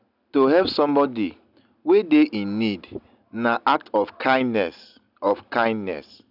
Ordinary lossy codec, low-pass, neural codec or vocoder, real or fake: none; 5.4 kHz; none; real